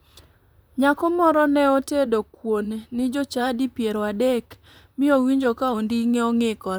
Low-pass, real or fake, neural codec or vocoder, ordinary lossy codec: none; fake; vocoder, 44.1 kHz, 128 mel bands, Pupu-Vocoder; none